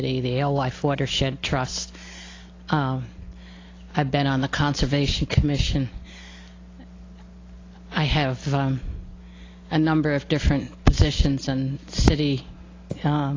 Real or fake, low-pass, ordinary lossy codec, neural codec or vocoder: real; 7.2 kHz; AAC, 32 kbps; none